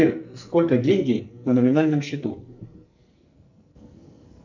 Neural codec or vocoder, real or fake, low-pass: codec, 44.1 kHz, 2.6 kbps, SNAC; fake; 7.2 kHz